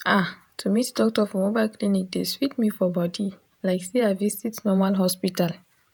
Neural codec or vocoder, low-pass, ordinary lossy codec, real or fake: vocoder, 48 kHz, 128 mel bands, Vocos; none; none; fake